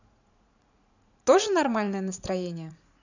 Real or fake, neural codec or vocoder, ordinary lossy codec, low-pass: fake; vocoder, 44.1 kHz, 80 mel bands, Vocos; none; 7.2 kHz